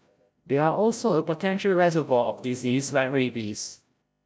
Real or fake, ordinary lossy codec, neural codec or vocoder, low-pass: fake; none; codec, 16 kHz, 0.5 kbps, FreqCodec, larger model; none